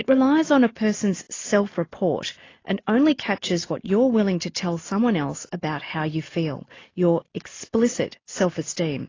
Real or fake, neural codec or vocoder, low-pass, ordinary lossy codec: real; none; 7.2 kHz; AAC, 32 kbps